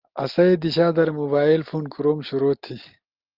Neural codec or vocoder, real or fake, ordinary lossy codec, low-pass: none; real; Opus, 24 kbps; 5.4 kHz